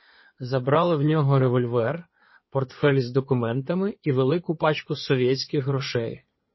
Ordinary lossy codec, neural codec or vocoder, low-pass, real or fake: MP3, 24 kbps; codec, 16 kHz in and 24 kHz out, 2.2 kbps, FireRedTTS-2 codec; 7.2 kHz; fake